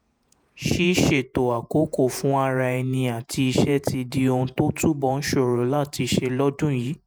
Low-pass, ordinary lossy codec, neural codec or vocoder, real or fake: none; none; vocoder, 48 kHz, 128 mel bands, Vocos; fake